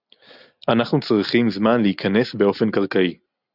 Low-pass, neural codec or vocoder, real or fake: 5.4 kHz; none; real